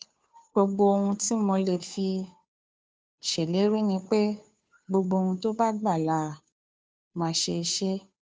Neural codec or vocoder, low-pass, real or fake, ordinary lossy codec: codec, 16 kHz, 2 kbps, FunCodec, trained on Chinese and English, 25 frames a second; none; fake; none